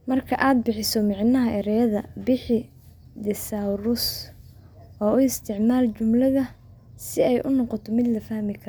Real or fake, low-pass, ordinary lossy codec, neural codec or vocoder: real; none; none; none